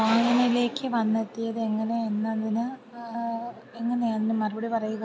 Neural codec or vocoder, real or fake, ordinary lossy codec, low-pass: none; real; none; none